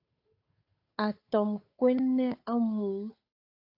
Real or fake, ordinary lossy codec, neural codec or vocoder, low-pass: fake; AAC, 32 kbps; codec, 16 kHz, 8 kbps, FunCodec, trained on Chinese and English, 25 frames a second; 5.4 kHz